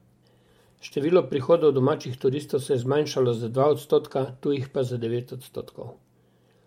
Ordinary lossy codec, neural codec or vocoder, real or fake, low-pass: MP3, 64 kbps; vocoder, 44.1 kHz, 128 mel bands every 512 samples, BigVGAN v2; fake; 19.8 kHz